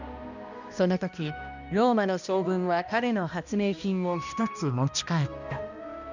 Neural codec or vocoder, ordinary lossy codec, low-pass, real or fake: codec, 16 kHz, 1 kbps, X-Codec, HuBERT features, trained on balanced general audio; none; 7.2 kHz; fake